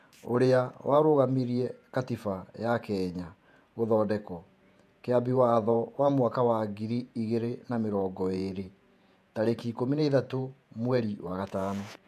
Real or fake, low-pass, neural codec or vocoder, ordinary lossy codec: fake; 14.4 kHz; vocoder, 48 kHz, 128 mel bands, Vocos; AAC, 96 kbps